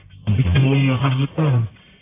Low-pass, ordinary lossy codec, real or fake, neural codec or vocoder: 3.6 kHz; AAC, 24 kbps; fake; codec, 44.1 kHz, 1.7 kbps, Pupu-Codec